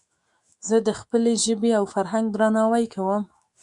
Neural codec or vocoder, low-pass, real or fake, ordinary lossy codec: autoencoder, 48 kHz, 128 numbers a frame, DAC-VAE, trained on Japanese speech; 10.8 kHz; fake; Opus, 64 kbps